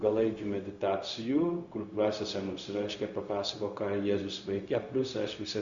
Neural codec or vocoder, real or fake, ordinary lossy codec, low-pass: codec, 16 kHz, 0.4 kbps, LongCat-Audio-Codec; fake; Opus, 64 kbps; 7.2 kHz